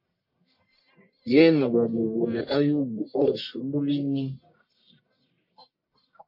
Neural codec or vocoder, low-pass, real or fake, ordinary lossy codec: codec, 44.1 kHz, 1.7 kbps, Pupu-Codec; 5.4 kHz; fake; MP3, 32 kbps